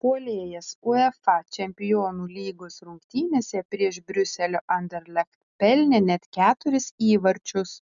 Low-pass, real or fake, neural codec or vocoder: 7.2 kHz; real; none